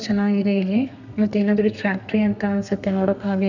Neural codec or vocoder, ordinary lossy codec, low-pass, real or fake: codec, 32 kHz, 1.9 kbps, SNAC; none; 7.2 kHz; fake